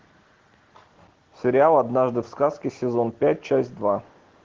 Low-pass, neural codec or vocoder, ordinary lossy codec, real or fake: 7.2 kHz; none; Opus, 16 kbps; real